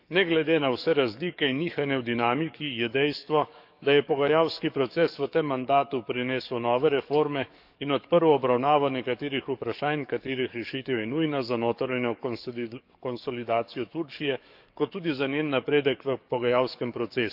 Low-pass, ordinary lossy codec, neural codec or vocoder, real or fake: 5.4 kHz; none; codec, 44.1 kHz, 7.8 kbps, DAC; fake